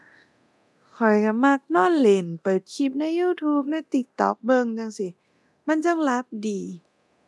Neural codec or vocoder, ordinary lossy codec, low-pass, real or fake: codec, 24 kHz, 0.9 kbps, DualCodec; none; none; fake